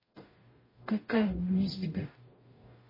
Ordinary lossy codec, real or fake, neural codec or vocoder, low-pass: MP3, 24 kbps; fake; codec, 44.1 kHz, 0.9 kbps, DAC; 5.4 kHz